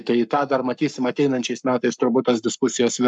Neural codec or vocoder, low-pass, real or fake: codec, 44.1 kHz, 7.8 kbps, Pupu-Codec; 10.8 kHz; fake